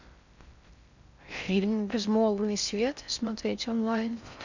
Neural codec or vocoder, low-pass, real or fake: codec, 16 kHz in and 24 kHz out, 0.6 kbps, FocalCodec, streaming, 4096 codes; 7.2 kHz; fake